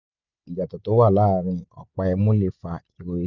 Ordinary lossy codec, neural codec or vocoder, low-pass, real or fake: none; none; 7.2 kHz; real